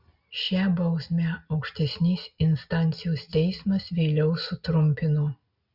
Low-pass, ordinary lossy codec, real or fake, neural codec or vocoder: 5.4 kHz; Opus, 64 kbps; real; none